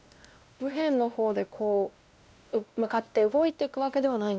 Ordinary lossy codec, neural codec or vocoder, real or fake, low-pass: none; codec, 16 kHz, 1 kbps, X-Codec, WavLM features, trained on Multilingual LibriSpeech; fake; none